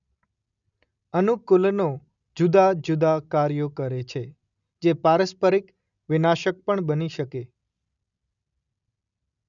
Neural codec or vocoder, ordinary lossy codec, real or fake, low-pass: none; none; real; 7.2 kHz